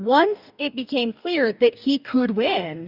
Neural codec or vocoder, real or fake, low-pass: codec, 44.1 kHz, 2.6 kbps, DAC; fake; 5.4 kHz